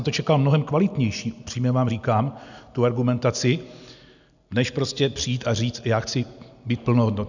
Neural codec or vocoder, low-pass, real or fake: none; 7.2 kHz; real